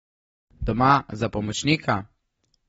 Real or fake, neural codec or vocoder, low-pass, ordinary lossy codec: real; none; 19.8 kHz; AAC, 24 kbps